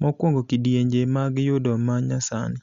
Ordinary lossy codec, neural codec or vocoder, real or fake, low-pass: Opus, 64 kbps; none; real; 7.2 kHz